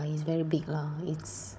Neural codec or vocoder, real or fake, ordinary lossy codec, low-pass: codec, 16 kHz, 16 kbps, FunCodec, trained on Chinese and English, 50 frames a second; fake; none; none